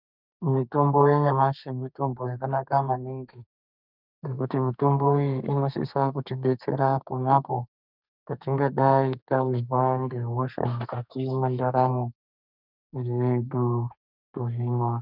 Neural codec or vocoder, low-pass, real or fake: codec, 32 kHz, 1.9 kbps, SNAC; 5.4 kHz; fake